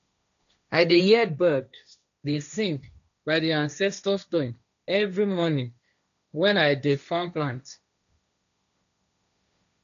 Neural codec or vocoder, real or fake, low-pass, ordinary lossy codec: codec, 16 kHz, 1.1 kbps, Voila-Tokenizer; fake; 7.2 kHz; none